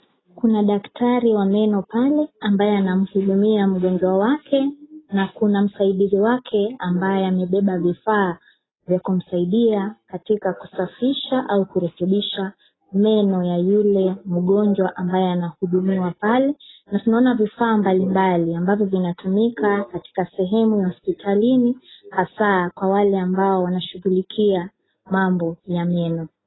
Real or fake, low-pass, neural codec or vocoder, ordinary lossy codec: real; 7.2 kHz; none; AAC, 16 kbps